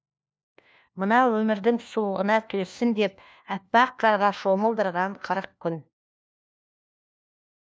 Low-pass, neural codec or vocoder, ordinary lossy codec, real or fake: none; codec, 16 kHz, 1 kbps, FunCodec, trained on LibriTTS, 50 frames a second; none; fake